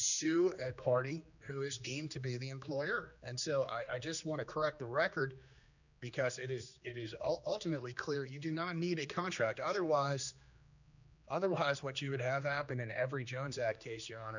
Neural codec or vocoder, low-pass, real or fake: codec, 16 kHz, 2 kbps, X-Codec, HuBERT features, trained on general audio; 7.2 kHz; fake